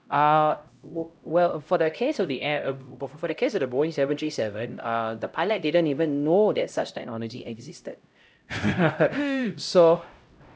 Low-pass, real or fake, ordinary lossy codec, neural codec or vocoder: none; fake; none; codec, 16 kHz, 0.5 kbps, X-Codec, HuBERT features, trained on LibriSpeech